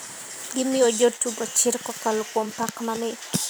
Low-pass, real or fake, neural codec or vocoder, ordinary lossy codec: none; fake; vocoder, 44.1 kHz, 128 mel bands every 512 samples, BigVGAN v2; none